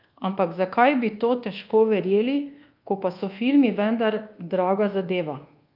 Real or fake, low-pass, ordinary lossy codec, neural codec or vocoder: fake; 5.4 kHz; Opus, 24 kbps; codec, 24 kHz, 1.2 kbps, DualCodec